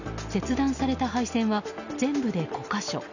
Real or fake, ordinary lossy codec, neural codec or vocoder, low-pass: real; none; none; 7.2 kHz